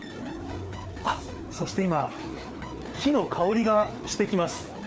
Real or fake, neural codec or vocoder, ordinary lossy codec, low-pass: fake; codec, 16 kHz, 4 kbps, FreqCodec, larger model; none; none